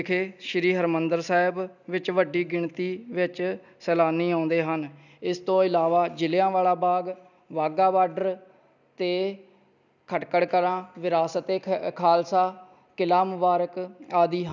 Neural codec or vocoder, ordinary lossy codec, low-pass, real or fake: none; none; 7.2 kHz; real